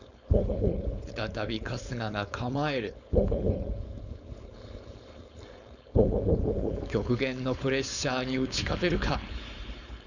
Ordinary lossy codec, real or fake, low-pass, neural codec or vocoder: none; fake; 7.2 kHz; codec, 16 kHz, 4.8 kbps, FACodec